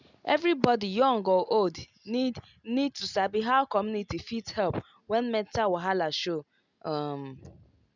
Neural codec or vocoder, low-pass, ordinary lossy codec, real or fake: none; 7.2 kHz; none; real